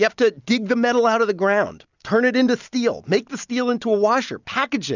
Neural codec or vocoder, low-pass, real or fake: none; 7.2 kHz; real